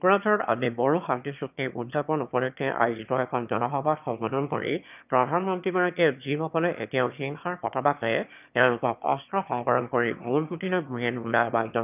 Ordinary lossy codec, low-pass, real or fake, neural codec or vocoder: none; 3.6 kHz; fake; autoencoder, 22.05 kHz, a latent of 192 numbers a frame, VITS, trained on one speaker